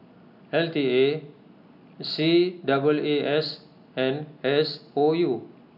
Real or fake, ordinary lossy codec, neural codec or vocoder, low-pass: real; none; none; 5.4 kHz